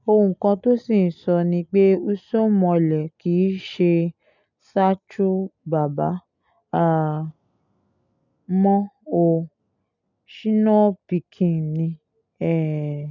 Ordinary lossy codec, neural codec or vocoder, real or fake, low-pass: none; none; real; 7.2 kHz